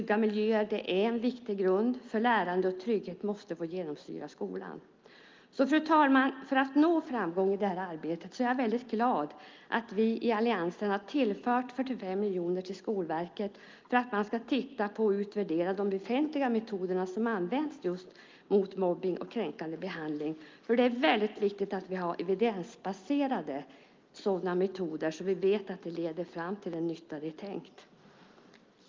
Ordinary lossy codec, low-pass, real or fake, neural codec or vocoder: Opus, 24 kbps; 7.2 kHz; real; none